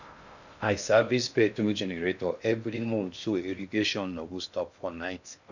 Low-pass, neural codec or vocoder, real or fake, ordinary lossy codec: 7.2 kHz; codec, 16 kHz in and 24 kHz out, 0.6 kbps, FocalCodec, streaming, 2048 codes; fake; none